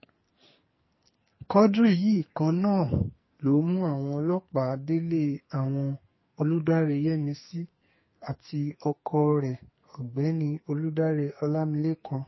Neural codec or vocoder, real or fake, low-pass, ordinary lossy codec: codec, 44.1 kHz, 2.6 kbps, SNAC; fake; 7.2 kHz; MP3, 24 kbps